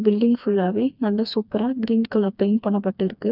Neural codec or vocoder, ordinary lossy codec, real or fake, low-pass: codec, 16 kHz, 2 kbps, FreqCodec, smaller model; none; fake; 5.4 kHz